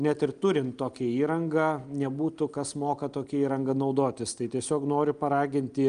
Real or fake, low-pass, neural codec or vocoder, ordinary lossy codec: real; 9.9 kHz; none; Opus, 32 kbps